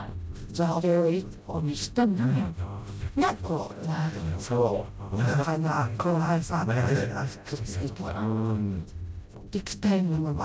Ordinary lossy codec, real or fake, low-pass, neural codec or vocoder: none; fake; none; codec, 16 kHz, 0.5 kbps, FreqCodec, smaller model